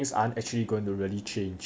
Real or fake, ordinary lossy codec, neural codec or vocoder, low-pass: real; none; none; none